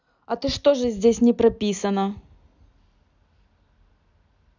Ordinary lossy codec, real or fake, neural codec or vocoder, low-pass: none; real; none; 7.2 kHz